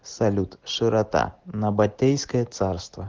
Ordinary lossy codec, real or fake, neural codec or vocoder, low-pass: Opus, 16 kbps; real; none; 7.2 kHz